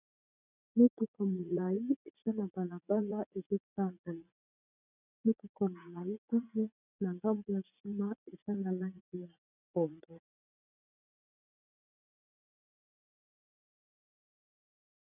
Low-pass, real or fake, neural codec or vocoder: 3.6 kHz; fake; vocoder, 44.1 kHz, 80 mel bands, Vocos